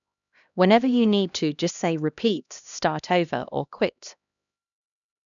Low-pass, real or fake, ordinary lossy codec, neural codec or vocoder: 7.2 kHz; fake; none; codec, 16 kHz, 1 kbps, X-Codec, HuBERT features, trained on LibriSpeech